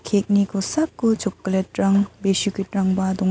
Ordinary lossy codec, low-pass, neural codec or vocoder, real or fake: none; none; none; real